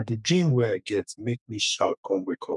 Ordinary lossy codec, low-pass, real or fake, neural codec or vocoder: none; 10.8 kHz; fake; codec, 32 kHz, 1.9 kbps, SNAC